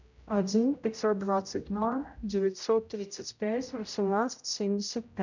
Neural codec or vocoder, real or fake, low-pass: codec, 16 kHz, 0.5 kbps, X-Codec, HuBERT features, trained on general audio; fake; 7.2 kHz